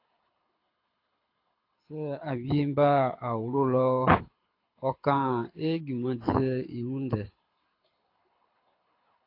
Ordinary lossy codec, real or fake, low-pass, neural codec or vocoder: AAC, 32 kbps; fake; 5.4 kHz; codec, 24 kHz, 6 kbps, HILCodec